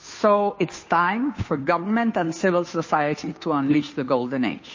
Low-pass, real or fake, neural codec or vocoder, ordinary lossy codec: 7.2 kHz; fake; codec, 16 kHz, 2 kbps, FunCodec, trained on Chinese and English, 25 frames a second; MP3, 32 kbps